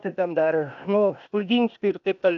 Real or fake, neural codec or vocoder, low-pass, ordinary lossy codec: fake; codec, 16 kHz, 0.8 kbps, ZipCodec; 7.2 kHz; MP3, 96 kbps